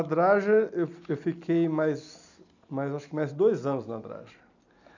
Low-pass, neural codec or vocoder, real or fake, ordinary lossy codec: 7.2 kHz; none; real; none